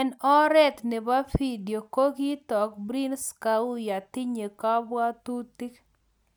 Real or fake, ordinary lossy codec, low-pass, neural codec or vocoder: real; none; none; none